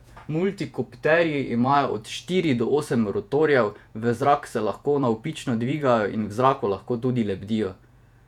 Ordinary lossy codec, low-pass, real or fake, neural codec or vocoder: none; 19.8 kHz; fake; vocoder, 48 kHz, 128 mel bands, Vocos